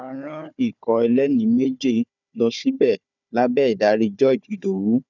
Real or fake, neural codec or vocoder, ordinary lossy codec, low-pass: fake; codec, 16 kHz, 4 kbps, FunCodec, trained on Chinese and English, 50 frames a second; none; 7.2 kHz